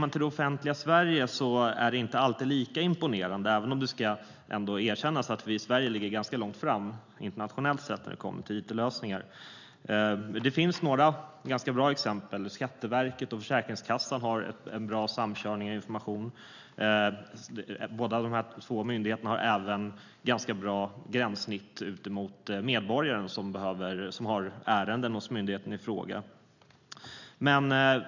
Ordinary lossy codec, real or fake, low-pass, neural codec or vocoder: none; real; 7.2 kHz; none